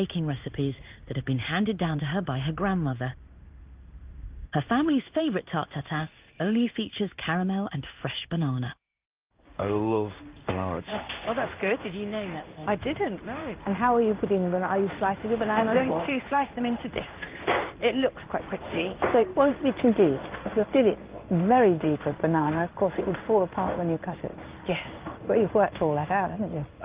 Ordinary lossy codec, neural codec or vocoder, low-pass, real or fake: Opus, 24 kbps; codec, 16 kHz in and 24 kHz out, 1 kbps, XY-Tokenizer; 3.6 kHz; fake